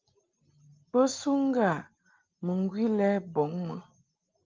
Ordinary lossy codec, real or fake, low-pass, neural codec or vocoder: Opus, 32 kbps; real; 7.2 kHz; none